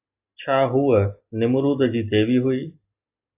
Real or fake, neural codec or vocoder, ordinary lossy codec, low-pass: real; none; AAC, 32 kbps; 3.6 kHz